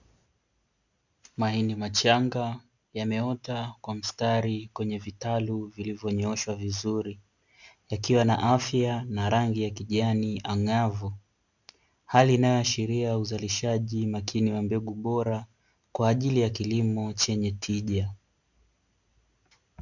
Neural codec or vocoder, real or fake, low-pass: none; real; 7.2 kHz